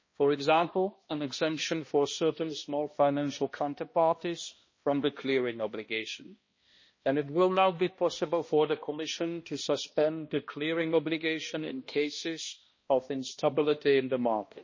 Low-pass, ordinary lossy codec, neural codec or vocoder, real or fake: 7.2 kHz; MP3, 32 kbps; codec, 16 kHz, 1 kbps, X-Codec, HuBERT features, trained on balanced general audio; fake